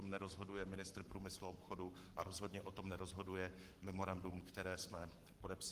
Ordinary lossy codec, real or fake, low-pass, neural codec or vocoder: Opus, 24 kbps; fake; 14.4 kHz; codec, 44.1 kHz, 7.8 kbps, Pupu-Codec